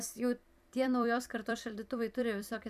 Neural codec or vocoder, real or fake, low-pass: vocoder, 44.1 kHz, 128 mel bands every 256 samples, BigVGAN v2; fake; 14.4 kHz